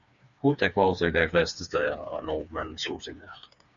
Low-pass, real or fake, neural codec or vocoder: 7.2 kHz; fake; codec, 16 kHz, 4 kbps, FreqCodec, smaller model